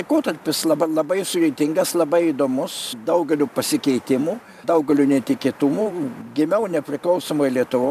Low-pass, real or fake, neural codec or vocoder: 14.4 kHz; fake; vocoder, 44.1 kHz, 128 mel bands every 512 samples, BigVGAN v2